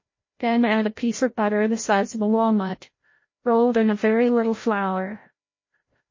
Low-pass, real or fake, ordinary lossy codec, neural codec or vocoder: 7.2 kHz; fake; MP3, 32 kbps; codec, 16 kHz, 0.5 kbps, FreqCodec, larger model